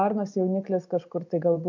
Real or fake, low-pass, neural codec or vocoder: real; 7.2 kHz; none